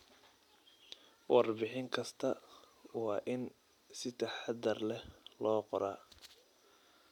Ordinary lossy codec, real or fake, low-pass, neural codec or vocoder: none; real; 19.8 kHz; none